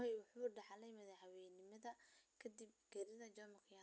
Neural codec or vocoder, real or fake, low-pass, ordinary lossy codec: none; real; none; none